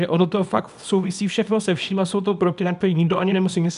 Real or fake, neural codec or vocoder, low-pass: fake; codec, 24 kHz, 0.9 kbps, WavTokenizer, small release; 10.8 kHz